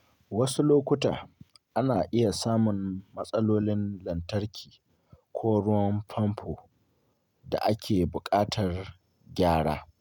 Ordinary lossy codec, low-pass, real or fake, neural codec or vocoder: none; 19.8 kHz; real; none